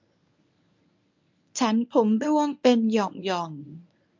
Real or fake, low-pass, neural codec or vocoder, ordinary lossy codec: fake; 7.2 kHz; codec, 24 kHz, 0.9 kbps, WavTokenizer, medium speech release version 1; MP3, 64 kbps